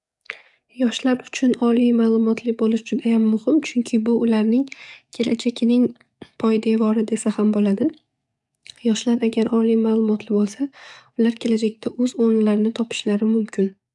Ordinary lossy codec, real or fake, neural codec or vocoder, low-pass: none; fake; codec, 44.1 kHz, 7.8 kbps, DAC; 10.8 kHz